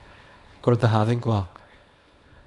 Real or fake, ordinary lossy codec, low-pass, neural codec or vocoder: fake; AAC, 64 kbps; 10.8 kHz; codec, 24 kHz, 0.9 kbps, WavTokenizer, small release